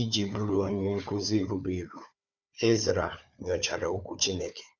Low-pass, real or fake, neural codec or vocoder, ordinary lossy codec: 7.2 kHz; fake; codec, 16 kHz, 4 kbps, FunCodec, trained on Chinese and English, 50 frames a second; none